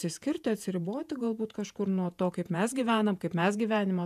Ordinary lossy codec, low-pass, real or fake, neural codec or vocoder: MP3, 96 kbps; 14.4 kHz; fake; vocoder, 48 kHz, 128 mel bands, Vocos